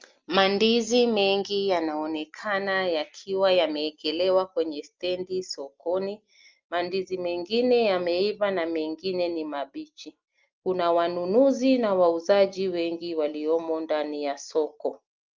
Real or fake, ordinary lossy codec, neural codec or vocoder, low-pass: real; Opus, 32 kbps; none; 7.2 kHz